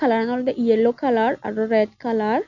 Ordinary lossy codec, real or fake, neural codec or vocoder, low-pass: none; real; none; 7.2 kHz